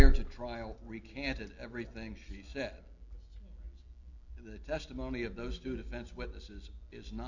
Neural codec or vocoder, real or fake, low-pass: none; real; 7.2 kHz